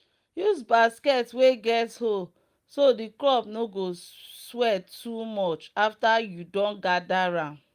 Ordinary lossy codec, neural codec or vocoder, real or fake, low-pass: Opus, 32 kbps; none; real; 14.4 kHz